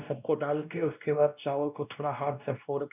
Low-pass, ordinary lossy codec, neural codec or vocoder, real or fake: 3.6 kHz; none; codec, 16 kHz, 1 kbps, X-Codec, WavLM features, trained on Multilingual LibriSpeech; fake